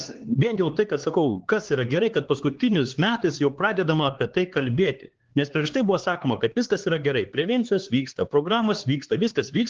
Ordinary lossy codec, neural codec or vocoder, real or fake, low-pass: Opus, 16 kbps; codec, 16 kHz, 2 kbps, X-Codec, HuBERT features, trained on LibriSpeech; fake; 7.2 kHz